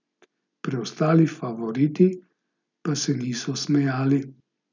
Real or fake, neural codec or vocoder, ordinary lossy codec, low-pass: real; none; none; 7.2 kHz